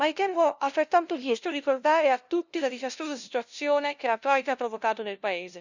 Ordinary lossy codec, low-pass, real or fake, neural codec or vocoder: none; 7.2 kHz; fake; codec, 16 kHz, 0.5 kbps, FunCodec, trained on LibriTTS, 25 frames a second